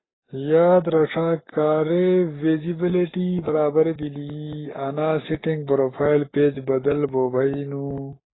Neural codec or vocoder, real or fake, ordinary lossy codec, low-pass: codec, 44.1 kHz, 7.8 kbps, Pupu-Codec; fake; AAC, 16 kbps; 7.2 kHz